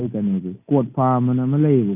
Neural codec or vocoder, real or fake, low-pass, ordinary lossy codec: none; real; 3.6 kHz; none